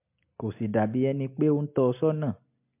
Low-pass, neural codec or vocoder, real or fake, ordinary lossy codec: 3.6 kHz; none; real; MP3, 32 kbps